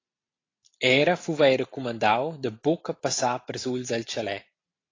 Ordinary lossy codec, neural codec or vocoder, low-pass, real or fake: AAC, 32 kbps; none; 7.2 kHz; real